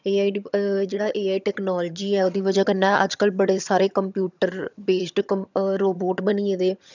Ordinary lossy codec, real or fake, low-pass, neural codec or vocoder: none; fake; 7.2 kHz; vocoder, 22.05 kHz, 80 mel bands, HiFi-GAN